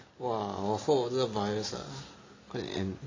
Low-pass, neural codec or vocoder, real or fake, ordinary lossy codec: 7.2 kHz; none; real; AAC, 32 kbps